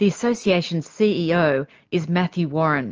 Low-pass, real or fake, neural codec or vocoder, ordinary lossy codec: 7.2 kHz; real; none; Opus, 24 kbps